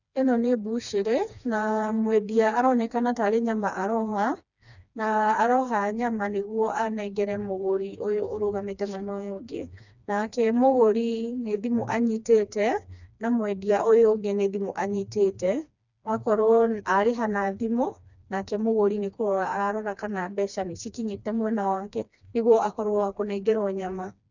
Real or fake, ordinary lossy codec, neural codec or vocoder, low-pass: fake; none; codec, 16 kHz, 2 kbps, FreqCodec, smaller model; 7.2 kHz